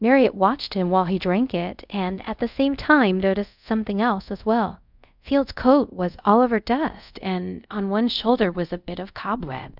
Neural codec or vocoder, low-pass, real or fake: codec, 24 kHz, 0.5 kbps, DualCodec; 5.4 kHz; fake